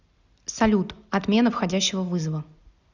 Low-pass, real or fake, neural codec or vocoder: 7.2 kHz; real; none